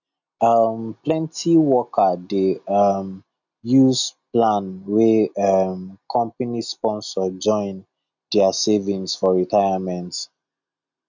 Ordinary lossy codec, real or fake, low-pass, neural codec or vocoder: none; real; 7.2 kHz; none